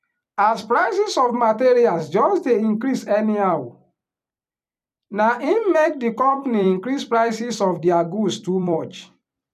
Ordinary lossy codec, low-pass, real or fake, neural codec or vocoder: none; 14.4 kHz; fake; vocoder, 44.1 kHz, 128 mel bands every 256 samples, BigVGAN v2